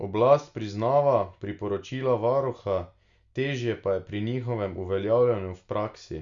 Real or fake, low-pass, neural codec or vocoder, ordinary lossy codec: real; 7.2 kHz; none; Opus, 64 kbps